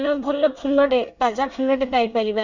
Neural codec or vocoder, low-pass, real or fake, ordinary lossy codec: codec, 24 kHz, 1 kbps, SNAC; 7.2 kHz; fake; none